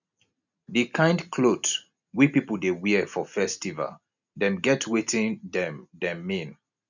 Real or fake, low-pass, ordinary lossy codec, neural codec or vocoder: real; 7.2 kHz; none; none